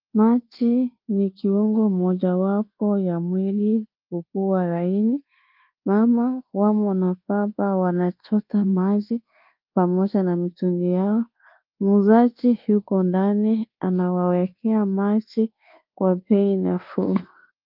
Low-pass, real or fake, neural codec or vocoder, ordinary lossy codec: 5.4 kHz; fake; codec, 24 kHz, 1.2 kbps, DualCodec; Opus, 32 kbps